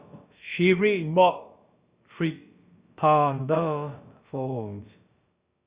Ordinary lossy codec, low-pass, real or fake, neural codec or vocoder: Opus, 64 kbps; 3.6 kHz; fake; codec, 16 kHz, about 1 kbps, DyCAST, with the encoder's durations